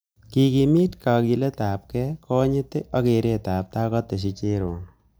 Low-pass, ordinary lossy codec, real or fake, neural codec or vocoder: none; none; real; none